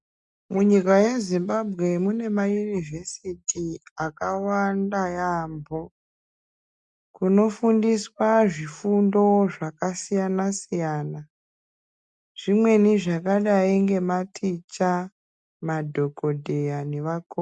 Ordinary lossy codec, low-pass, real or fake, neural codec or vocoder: MP3, 96 kbps; 10.8 kHz; real; none